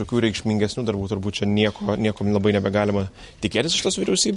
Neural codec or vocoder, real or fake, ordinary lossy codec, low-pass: none; real; MP3, 48 kbps; 14.4 kHz